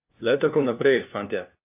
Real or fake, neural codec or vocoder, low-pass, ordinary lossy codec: fake; codec, 16 kHz, 1 kbps, FunCodec, trained on LibriTTS, 50 frames a second; 3.6 kHz; AAC, 32 kbps